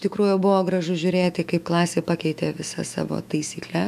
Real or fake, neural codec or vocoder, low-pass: fake; autoencoder, 48 kHz, 128 numbers a frame, DAC-VAE, trained on Japanese speech; 14.4 kHz